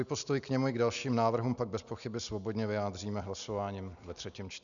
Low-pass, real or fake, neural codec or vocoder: 7.2 kHz; real; none